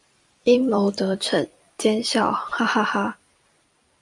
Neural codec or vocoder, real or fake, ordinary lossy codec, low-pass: vocoder, 44.1 kHz, 128 mel bands every 256 samples, BigVGAN v2; fake; AAC, 64 kbps; 10.8 kHz